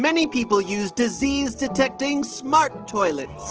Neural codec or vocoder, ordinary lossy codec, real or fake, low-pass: none; Opus, 16 kbps; real; 7.2 kHz